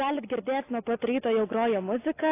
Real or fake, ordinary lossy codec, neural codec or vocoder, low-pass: real; AAC, 24 kbps; none; 3.6 kHz